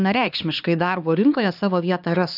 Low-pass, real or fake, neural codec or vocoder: 5.4 kHz; fake; codec, 16 kHz, 2 kbps, X-Codec, HuBERT features, trained on LibriSpeech